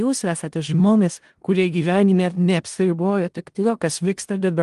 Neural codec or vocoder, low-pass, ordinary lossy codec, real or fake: codec, 16 kHz in and 24 kHz out, 0.4 kbps, LongCat-Audio-Codec, four codebook decoder; 10.8 kHz; Opus, 24 kbps; fake